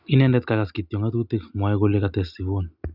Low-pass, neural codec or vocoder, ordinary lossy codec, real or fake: 5.4 kHz; none; none; real